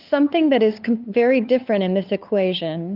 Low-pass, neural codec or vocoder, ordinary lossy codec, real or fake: 5.4 kHz; codec, 16 kHz, 8 kbps, FunCodec, trained on LibriTTS, 25 frames a second; Opus, 32 kbps; fake